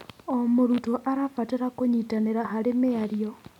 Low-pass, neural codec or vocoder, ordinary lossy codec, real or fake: 19.8 kHz; none; none; real